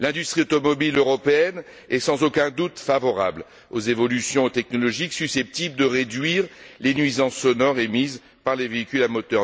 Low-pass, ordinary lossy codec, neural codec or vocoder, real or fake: none; none; none; real